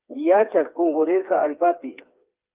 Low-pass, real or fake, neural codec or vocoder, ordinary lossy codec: 3.6 kHz; fake; codec, 16 kHz, 4 kbps, FreqCodec, smaller model; Opus, 24 kbps